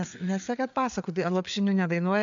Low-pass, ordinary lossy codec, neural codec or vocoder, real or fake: 7.2 kHz; MP3, 64 kbps; codec, 16 kHz, 4 kbps, FreqCodec, larger model; fake